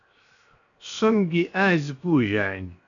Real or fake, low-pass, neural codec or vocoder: fake; 7.2 kHz; codec, 16 kHz, 0.7 kbps, FocalCodec